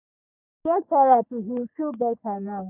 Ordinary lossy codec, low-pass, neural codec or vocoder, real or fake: none; 3.6 kHz; codec, 44.1 kHz, 3.4 kbps, Pupu-Codec; fake